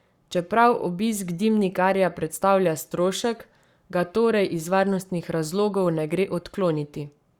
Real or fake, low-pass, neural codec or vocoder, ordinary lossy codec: fake; 19.8 kHz; codec, 44.1 kHz, 7.8 kbps, Pupu-Codec; Opus, 64 kbps